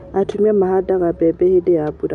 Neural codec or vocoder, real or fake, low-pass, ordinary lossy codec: none; real; 10.8 kHz; none